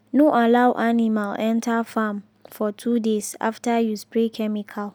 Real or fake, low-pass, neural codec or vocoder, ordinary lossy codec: real; none; none; none